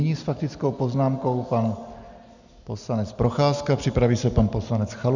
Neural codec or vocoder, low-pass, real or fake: none; 7.2 kHz; real